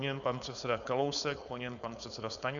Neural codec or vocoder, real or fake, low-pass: codec, 16 kHz, 4.8 kbps, FACodec; fake; 7.2 kHz